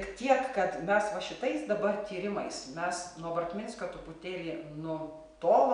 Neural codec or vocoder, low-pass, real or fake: none; 9.9 kHz; real